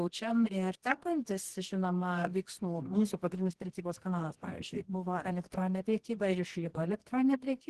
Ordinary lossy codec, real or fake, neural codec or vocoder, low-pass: Opus, 16 kbps; fake; codec, 24 kHz, 0.9 kbps, WavTokenizer, medium music audio release; 10.8 kHz